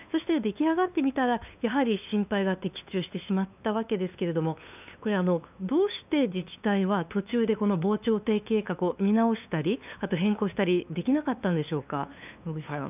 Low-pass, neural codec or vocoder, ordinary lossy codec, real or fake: 3.6 kHz; codec, 16 kHz, 2 kbps, FunCodec, trained on LibriTTS, 25 frames a second; none; fake